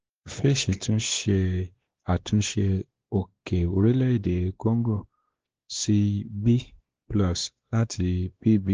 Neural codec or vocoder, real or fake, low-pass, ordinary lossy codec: codec, 16 kHz, 2 kbps, X-Codec, WavLM features, trained on Multilingual LibriSpeech; fake; 7.2 kHz; Opus, 16 kbps